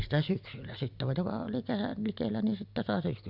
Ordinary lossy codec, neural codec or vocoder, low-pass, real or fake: none; none; 5.4 kHz; real